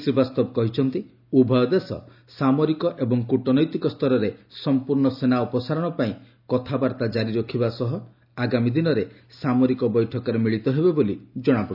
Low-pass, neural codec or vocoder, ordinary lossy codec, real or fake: 5.4 kHz; none; none; real